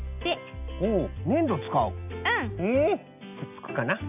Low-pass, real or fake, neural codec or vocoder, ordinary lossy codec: 3.6 kHz; real; none; none